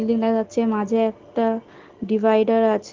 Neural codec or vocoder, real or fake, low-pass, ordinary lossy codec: none; real; 7.2 kHz; Opus, 16 kbps